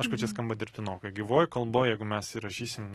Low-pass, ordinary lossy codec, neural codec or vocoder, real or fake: 19.8 kHz; AAC, 32 kbps; none; real